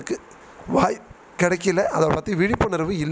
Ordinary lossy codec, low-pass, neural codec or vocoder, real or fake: none; none; none; real